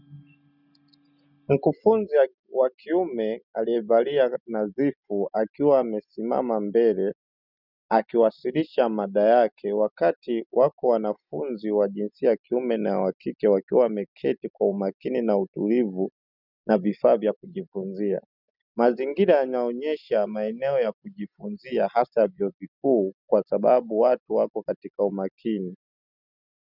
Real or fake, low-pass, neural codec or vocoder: real; 5.4 kHz; none